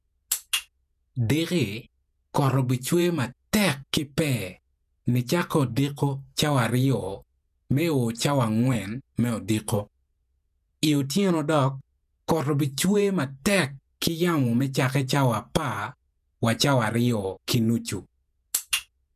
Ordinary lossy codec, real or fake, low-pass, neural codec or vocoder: none; fake; 14.4 kHz; vocoder, 48 kHz, 128 mel bands, Vocos